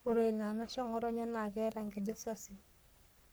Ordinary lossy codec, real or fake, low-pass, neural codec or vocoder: none; fake; none; codec, 44.1 kHz, 3.4 kbps, Pupu-Codec